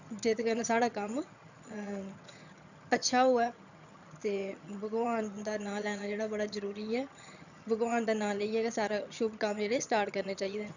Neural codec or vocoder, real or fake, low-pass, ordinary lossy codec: vocoder, 22.05 kHz, 80 mel bands, HiFi-GAN; fake; 7.2 kHz; none